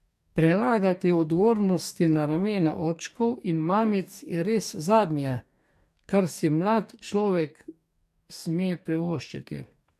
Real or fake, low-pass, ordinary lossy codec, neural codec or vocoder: fake; 14.4 kHz; none; codec, 44.1 kHz, 2.6 kbps, DAC